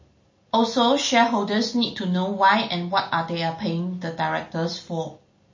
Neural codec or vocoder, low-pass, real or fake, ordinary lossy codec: none; 7.2 kHz; real; MP3, 32 kbps